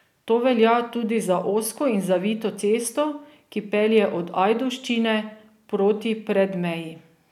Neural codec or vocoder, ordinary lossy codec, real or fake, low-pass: none; none; real; 19.8 kHz